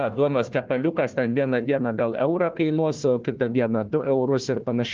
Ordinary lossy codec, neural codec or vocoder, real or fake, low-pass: Opus, 32 kbps; codec, 16 kHz, 1 kbps, FunCodec, trained on Chinese and English, 50 frames a second; fake; 7.2 kHz